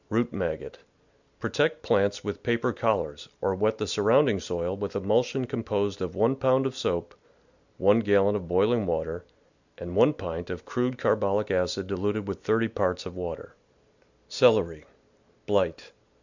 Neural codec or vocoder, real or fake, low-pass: none; real; 7.2 kHz